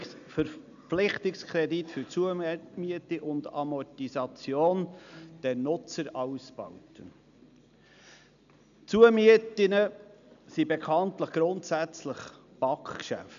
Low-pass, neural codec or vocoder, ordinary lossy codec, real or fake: 7.2 kHz; none; none; real